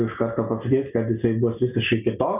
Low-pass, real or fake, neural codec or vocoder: 3.6 kHz; real; none